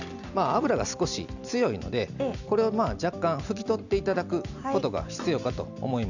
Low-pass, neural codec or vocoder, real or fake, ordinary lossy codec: 7.2 kHz; none; real; none